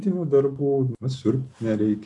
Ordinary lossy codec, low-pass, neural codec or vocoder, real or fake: AAC, 48 kbps; 10.8 kHz; none; real